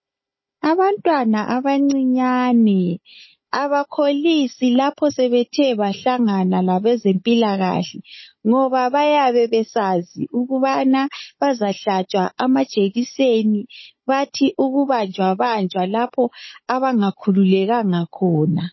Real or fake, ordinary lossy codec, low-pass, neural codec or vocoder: fake; MP3, 24 kbps; 7.2 kHz; codec, 16 kHz, 16 kbps, FunCodec, trained on Chinese and English, 50 frames a second